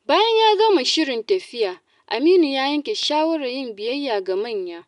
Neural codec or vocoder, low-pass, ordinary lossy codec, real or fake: none; 10.8 kHz; none; real